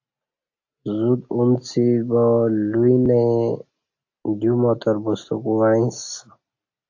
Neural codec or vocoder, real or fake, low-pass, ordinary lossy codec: none; real; 7.2 kHz; AAC, 48 kbps